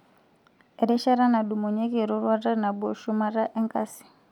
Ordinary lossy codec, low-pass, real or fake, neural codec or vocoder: none; 19.8 kHz; real; none